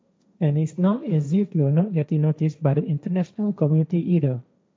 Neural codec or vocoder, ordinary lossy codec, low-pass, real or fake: codec, 16 kHz, 1.1 kbps, Voila-Tokenizer; none; none; fake